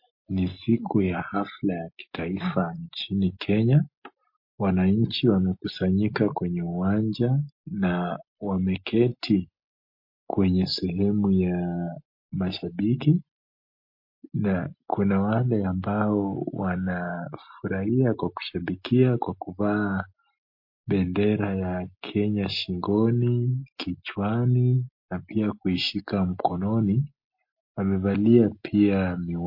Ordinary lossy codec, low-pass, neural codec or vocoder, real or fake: MP3, 32 kbps; 5.4 kHz; none; real